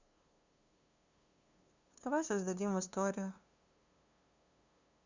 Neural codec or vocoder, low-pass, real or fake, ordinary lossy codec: codec, 16 kHz, 2 kbps, FunCodec, trained on LibriTTS, 25 frames a second; 7.2 kHz; fake; Opus, 64 kbps